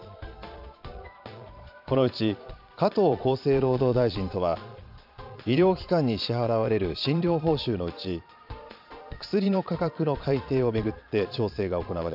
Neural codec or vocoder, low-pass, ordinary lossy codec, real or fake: vocoder, 22.05 kHz, 80 mel bands, Vocos; 5.4 kHz; AAC, 48 kbps; fake